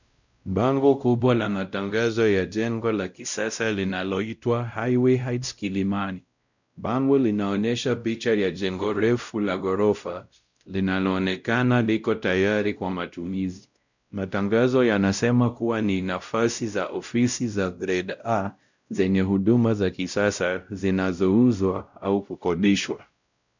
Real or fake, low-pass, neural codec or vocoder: fake; 7.2 kHz; codec, 16 kHz, 0.5 kbps, X-Codec, WavLM features, trained on Multilingual LibriSpeech